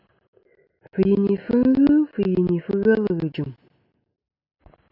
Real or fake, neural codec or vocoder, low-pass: real; none; 5.4 kHz